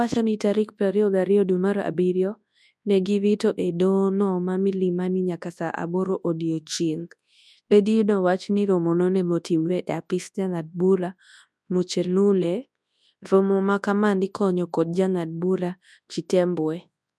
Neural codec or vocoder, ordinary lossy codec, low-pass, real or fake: codec, 24 kHz, 0.9 kbps, WavTokenizer, large speech release; none; none; fake